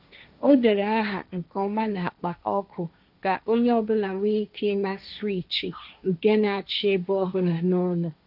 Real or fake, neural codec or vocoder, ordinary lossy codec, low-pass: fake; codec, 16 kHz, 1.1 kbps, Voila-Tokenizer; none; 5.4 kHz